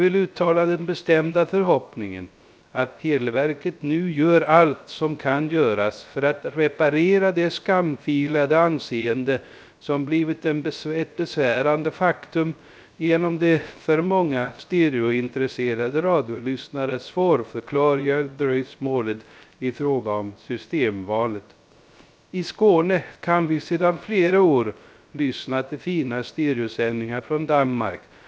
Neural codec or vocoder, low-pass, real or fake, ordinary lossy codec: codec, 16 kHz, 0.3 kbps, FocalCodec; none; fake; none